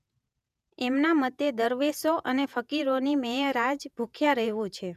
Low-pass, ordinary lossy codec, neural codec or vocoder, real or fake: 14.4 kHz; none; vocoder, 48 kHz, 128 mel bands, Vocos; fake